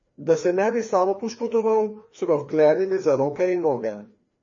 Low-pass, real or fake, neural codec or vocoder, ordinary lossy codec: 7.2 kHz; fake; codec, 16 kHz, 2 kbps, FreqCodec, larger model; MP3, 32 kbps